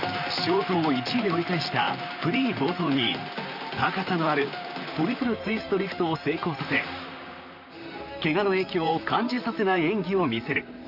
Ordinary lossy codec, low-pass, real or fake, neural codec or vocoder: none; 5.4 kHz; fake; vocoder, 44.1 kHz, 128 mel bands, Pupu-Vocoder